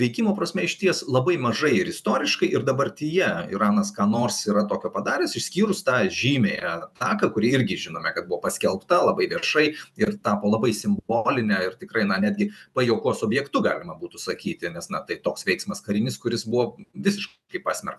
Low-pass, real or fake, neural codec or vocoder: 14.4 kHz; fake; vocoder, 48 kHz, 128 mel bands, Vocos